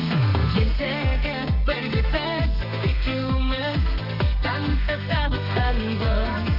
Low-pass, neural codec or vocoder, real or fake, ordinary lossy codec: 5.4 kHz; codec, 32 kHz, 1.9 kbps, SNAC; fake; none